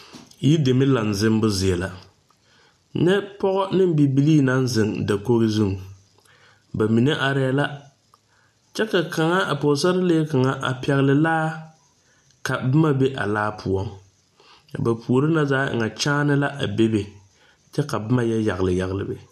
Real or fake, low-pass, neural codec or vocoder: real; 14.4 kHz; none